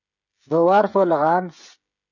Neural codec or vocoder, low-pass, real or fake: codec, 16 kHz, 16 kbps, FreqCodec, smaller model; 7.2 kHz; fake